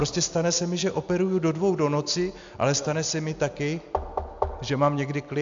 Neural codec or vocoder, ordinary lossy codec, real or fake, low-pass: none; AAC, 64 kbps; real; 7.2 kHz